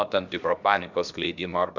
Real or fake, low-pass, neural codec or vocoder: fake; 7.2 kHz; codec, 16 kHz, about 1 kbps, DyCAST, with the encoder's durations